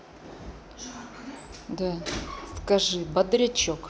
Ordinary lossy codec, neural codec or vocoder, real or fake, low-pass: none; none; real; none